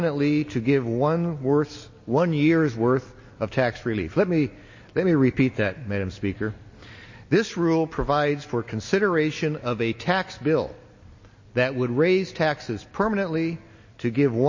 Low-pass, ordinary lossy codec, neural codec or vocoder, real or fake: 7.2 kHz; MP3, 32 kbps; none; real